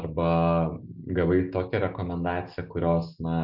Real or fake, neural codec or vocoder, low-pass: real; none; 5.4 kHz